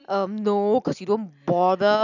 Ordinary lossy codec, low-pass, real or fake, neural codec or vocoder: none; 7.2 kHz; real; none